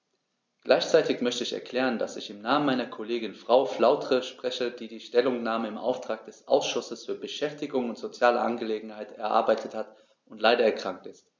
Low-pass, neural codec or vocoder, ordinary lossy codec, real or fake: none; none; none; real